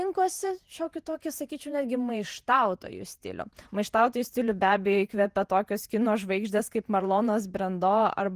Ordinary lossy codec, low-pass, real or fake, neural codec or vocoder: Opus, 24 kbps; 14.4 kHz; fake; vocoder, 48 kHz, 128 mel bands, Vocos